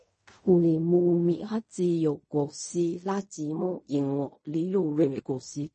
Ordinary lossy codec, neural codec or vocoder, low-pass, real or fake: MP3, 32 kbps; codec, 16 kHz in and 24 kHz out, 0.4 kbps, LongCat-Audio-Codec, fine tuned four codebook decoder; 10.8 kHz; fake